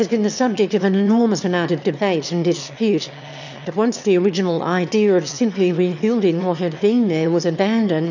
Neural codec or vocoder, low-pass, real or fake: autoencoder, 22.05 kHz, a latent of 192 numbers a frame, VITS, trained on one speaker; 7.2 kHz; fake